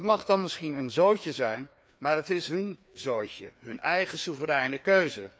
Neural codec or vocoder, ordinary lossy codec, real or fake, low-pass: codec, 16 kHz, 2 kbps, FreqCodec, larger model; none; fake; none